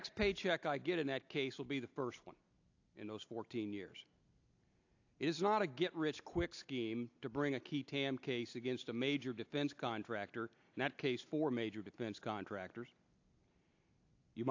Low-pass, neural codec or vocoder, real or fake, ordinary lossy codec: 7.2 kHz; none; real; AAC, 48 kbps